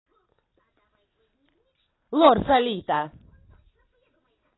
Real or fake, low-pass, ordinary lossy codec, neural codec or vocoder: real; 7.2 kHz; AAC, 16 kbps; none